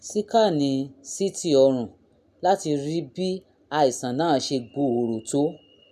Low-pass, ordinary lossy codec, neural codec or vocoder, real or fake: 14.4 kHz; none; none; real